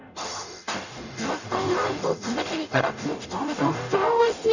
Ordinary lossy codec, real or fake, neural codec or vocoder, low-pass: none; fake; codec, 44.1 kHz, 0.9 kbps, DAC; 7.2 kHz